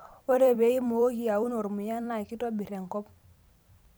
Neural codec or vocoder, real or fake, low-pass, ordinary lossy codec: vocoder, 44.1 kHz, 128 mel bands every 256 samples, BigVGAN v2; fake; none; none